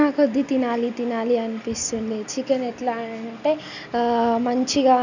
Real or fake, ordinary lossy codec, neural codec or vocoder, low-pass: real; none; none; 7.2 kHz